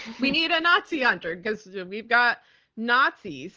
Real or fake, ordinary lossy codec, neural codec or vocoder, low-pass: real; Opus, 16 kbps; none; 7.2 kHz